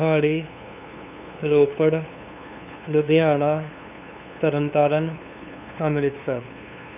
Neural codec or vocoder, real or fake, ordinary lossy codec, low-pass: codec, 16 kHz, 2 kbps, FunCodec, trained on LibriTTS, 25 frames a second; fake; none; 3.6 kHz